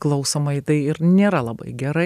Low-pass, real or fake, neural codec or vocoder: 14.4 kHz; real; none